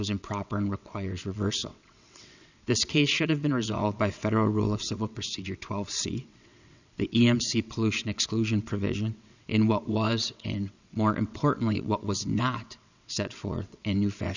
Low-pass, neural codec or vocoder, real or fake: 7.2 kHz; vocoder, 22.05 kHz, 80 mel bands, WaveNeXt; fake